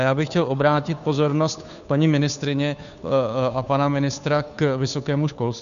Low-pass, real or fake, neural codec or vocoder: 7.2 kHz; fake; codec, 16 kHz, 2 kbps, FunCodec, trained on Chinese and English, 25 frames a second